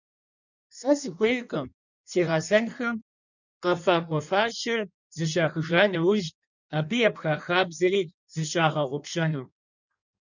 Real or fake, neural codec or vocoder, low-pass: fake; codec, 16 kHz in and 24 kHz out, 1.1 kbps, FireRedTTS-2 codec; 7.2 kHz